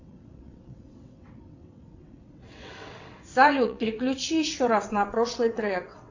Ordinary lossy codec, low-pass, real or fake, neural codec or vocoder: AAC, 48 kbps; 7.2 kHz; fake; vocoder, 22.05 kHz, 80 mel bands, WaveNeXt